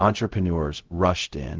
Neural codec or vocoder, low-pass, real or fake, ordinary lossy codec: codec, 16 kHz, 0.4 kbps, LongCat-Audio-Codec; 7.2 kHz; fake; Opus, 16 kbps